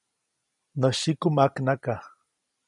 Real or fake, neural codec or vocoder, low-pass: real; none; 10.8 kHz